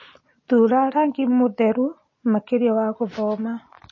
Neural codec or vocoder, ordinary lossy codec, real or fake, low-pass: none; MP3, 32 kbps; real; 7.2 kHz